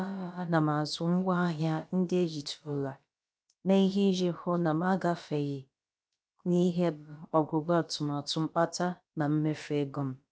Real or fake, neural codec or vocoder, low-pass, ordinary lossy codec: fake; codec, 16 kHz, about 1 kbps, DyCAST, with the encoder's durations; none; none